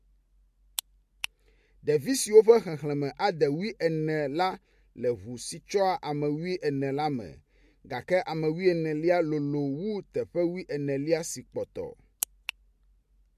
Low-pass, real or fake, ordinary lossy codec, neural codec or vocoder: 14.4 kHz; real; MP3, 64 kbps; none